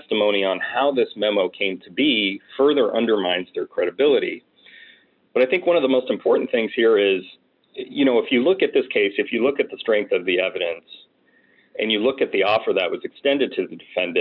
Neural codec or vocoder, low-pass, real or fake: none; 5.4 kHz; real